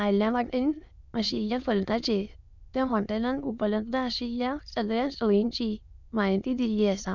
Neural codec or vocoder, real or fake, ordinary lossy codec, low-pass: autoencoder, 22.05 kHz, a latent of 192 numbers a frame, VITS, trained on many speakers; fake; none; 7.2 kHz